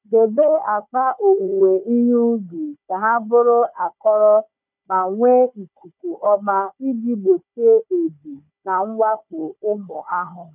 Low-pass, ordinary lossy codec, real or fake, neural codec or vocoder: 3.6 kHz; none; fake; codec, 16 kHz, 4 kbps, FunCodec, trained on Chinese and English, 50 frames a second